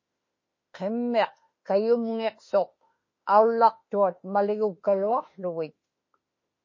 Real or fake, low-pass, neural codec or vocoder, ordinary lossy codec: fake; 7.2 kHz; autoencoder, 48 kHz, 32 numbers a frame, DAC-VAE, trained on Japanese speech; MP3, 32 kbps